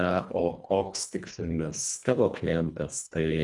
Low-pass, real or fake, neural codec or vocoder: 10.8 kHz; fake; codec, 24 kHz, 1.5 kbps, HILCodec